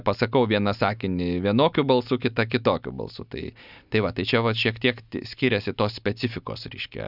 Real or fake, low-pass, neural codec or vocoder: real; 5.4 kHz; none